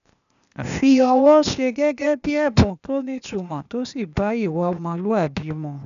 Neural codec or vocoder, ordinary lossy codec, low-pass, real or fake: codec, 16 kHz, 0.8 kbps, ZipCodec; none; 7.2 kHz; fake